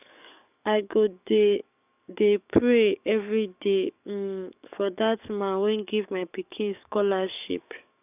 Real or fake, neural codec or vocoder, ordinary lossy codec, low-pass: fake; codec, 44.1 kHz, 7.8 kbps, DAC; none; 3.6 kHz